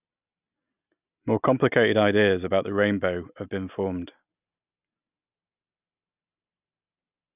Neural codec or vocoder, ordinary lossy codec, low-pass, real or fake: none; none; 3.6 kHz; real